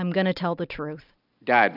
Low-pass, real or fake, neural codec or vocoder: 5.4 kHz; real; none